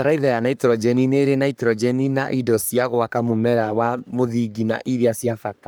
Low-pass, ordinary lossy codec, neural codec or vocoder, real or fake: none; none; codec, 44.1 kHz, 3.4 kbps, Pupu-Codec; fake